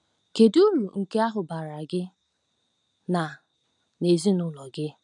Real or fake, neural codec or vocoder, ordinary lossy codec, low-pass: real; none; none; 10.8 kHz